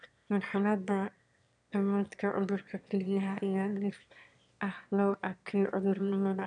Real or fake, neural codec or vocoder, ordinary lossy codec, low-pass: fake; autoencoder, 22.05 kHz, a latent of 192 numbers a frame, VITS, trained on one speaker; none; 9.9 kHz